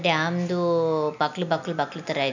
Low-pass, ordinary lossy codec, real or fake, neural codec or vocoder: 7.2 kHz; none; real; none